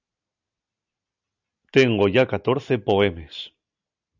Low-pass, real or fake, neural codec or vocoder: 7.2 kHz; real; none